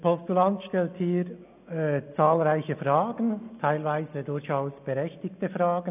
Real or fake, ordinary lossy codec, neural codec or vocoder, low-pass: real; none; none; 3.6 kHz